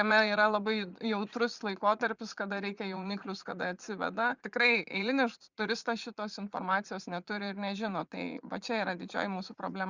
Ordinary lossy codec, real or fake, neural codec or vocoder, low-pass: Opus, 64 kbps; fake; vocoder, 44.1 kHz, 80 mel bands, Vocos; 7.2 kHz